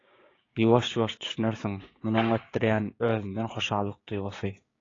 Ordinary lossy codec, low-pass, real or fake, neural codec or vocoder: AAC, 32 kbps; 7.2 kHz; fake; codec, 16 kHz, 6 kbps, DAC